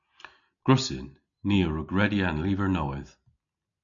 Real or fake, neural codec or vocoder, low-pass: real; none; 7.2 kHz